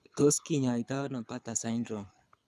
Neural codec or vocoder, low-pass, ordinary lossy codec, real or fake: codec, 24 kHz, 6 kbps, HILCodec; none; none; fake